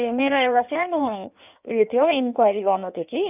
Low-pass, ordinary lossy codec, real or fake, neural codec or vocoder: 3.6 kHz; none; fake; codec, 16 kHz in and 24 kHz out, 1.1 kbps, FireRedTTS-2 codec